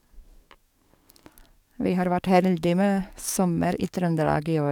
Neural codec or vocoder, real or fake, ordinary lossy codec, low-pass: codec, 44.1 kHz, 7.8 kbps, DAC; fake; none; 19.8 kHz